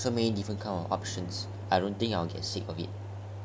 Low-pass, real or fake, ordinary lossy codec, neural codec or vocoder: none; real; none; none